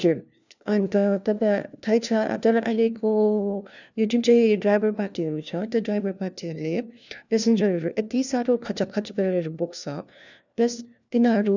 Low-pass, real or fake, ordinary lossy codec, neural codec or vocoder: 7.2 kHz; fake; none; codec, 16 kHz, 1 kbps, FunCodec, trained on LibriTTS, 50 frames a second